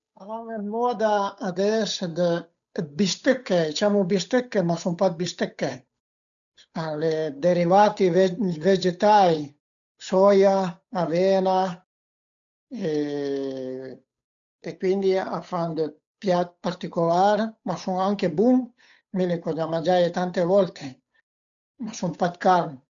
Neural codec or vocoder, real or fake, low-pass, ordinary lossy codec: codec, 16 kHz, 8 kbps, FunCodec, trained on Chinese and English, 25 frames a second; fake; 7.2 kHz; none